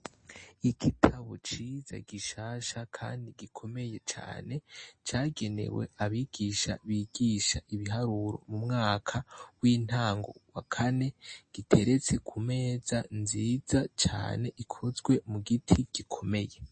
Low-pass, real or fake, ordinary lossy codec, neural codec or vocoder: 9.9 kHz; real; MP3, 32 kbps; none